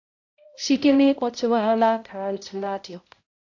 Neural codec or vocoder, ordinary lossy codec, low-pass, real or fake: codec, 16 kHz, 0.5 kbps, X-Codec, HuBERT features, trained on balanced general audio; AAC, 48 kbps; 7.2 kHz; fake